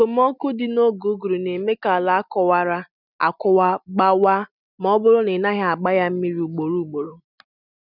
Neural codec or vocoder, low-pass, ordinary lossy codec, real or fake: none; 5.4 kHz; none; real